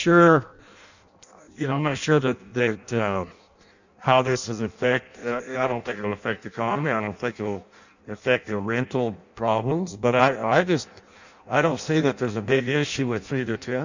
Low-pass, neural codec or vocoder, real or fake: 7.2 kHz; codec, 16 kHz in and 24 kHz out, 0.6 kbps, FireRedTTS-2 codec; fake